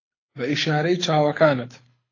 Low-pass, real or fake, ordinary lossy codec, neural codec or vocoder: 7.2 kHz; fake; AAC, 32 kbps; codec, 24 kHz, 6 kbps, HILCodec